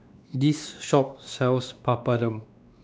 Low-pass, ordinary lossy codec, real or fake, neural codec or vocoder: none; none; fake; codec, 16 kHz, 2 kbps, X-Codec, WavLM features, trained on Multilingual LibriSpeech